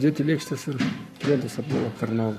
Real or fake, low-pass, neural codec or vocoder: fake; 14.4 kHz; codec, 44.1 kHz, 7.8 kbps, Pupu-Codec